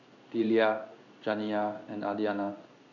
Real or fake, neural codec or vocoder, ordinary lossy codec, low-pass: fake; codec, 16 kHz in and 24 kHz out, 1 kbps, XY-Tokenizer; none; 7.2 kHz